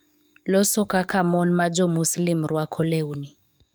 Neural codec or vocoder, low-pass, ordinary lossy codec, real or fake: codec, 44.1 kHz, 7.8 kbps, DAC; none; none; fake